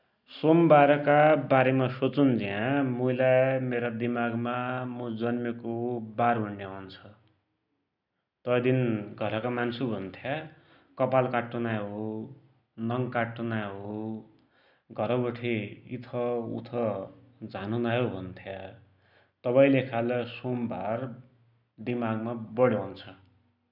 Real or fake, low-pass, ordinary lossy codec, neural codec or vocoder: real; 5.4 kHz; none; none